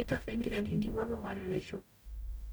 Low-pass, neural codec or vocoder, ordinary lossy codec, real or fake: none; codec, 44.1 kHz, 0.9 kbps, DAC; none; fake